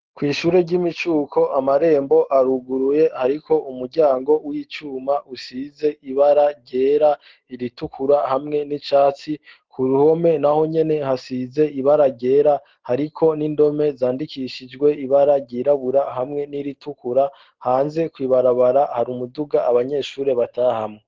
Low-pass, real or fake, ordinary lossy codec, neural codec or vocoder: 7.2 kHz; real; Opus, 16 kbps; none